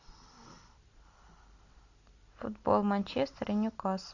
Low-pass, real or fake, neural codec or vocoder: 7.2 kHz; real; none